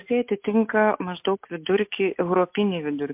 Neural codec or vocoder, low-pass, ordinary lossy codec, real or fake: none; 3.6 kHz; MP3, 32 kbps; real